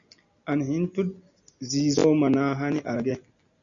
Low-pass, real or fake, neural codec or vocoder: 7.2 kHz; real; none